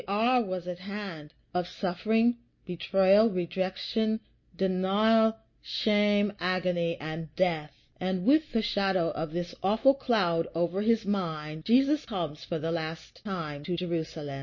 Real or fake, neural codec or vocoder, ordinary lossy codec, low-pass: real; none; MP3, 32 kbps; 7.2 kHz